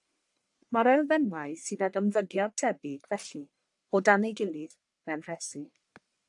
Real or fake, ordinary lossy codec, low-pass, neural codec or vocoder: fake; AAC, 64 kbps; 10.8 kHz; codec, 44.1 kHz, 1.7 kbps, Pupu-Codec